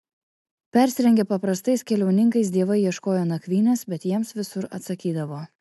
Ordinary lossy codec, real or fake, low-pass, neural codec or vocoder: AAC, 96 kbps; real; 14.4 kHz; none